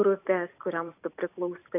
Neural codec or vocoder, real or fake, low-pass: vocoder, 44.1 kHz, 128 mel bands every 256 samples, BigVGAN v2; fake; 3.6 kHz